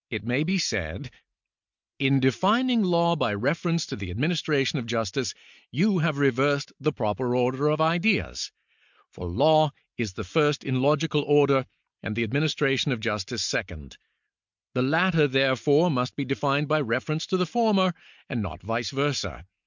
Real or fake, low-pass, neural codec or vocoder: real; 7.2 kHz; none